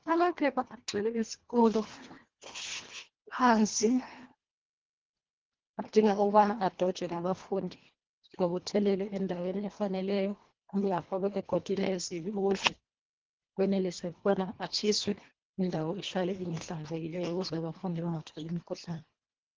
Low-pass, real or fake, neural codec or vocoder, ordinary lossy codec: 7.2 kHz; fake; codec, 24 kHz, 1.5 kbps, HILCodec; Opus, 16 kbps